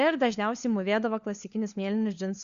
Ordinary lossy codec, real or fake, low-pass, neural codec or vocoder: Opus, 64 kbps; fake; 7.2 kHz; codec, 16 kHz, 4 kbps, FunCodec, trained on LibriTTS, 50 frames a second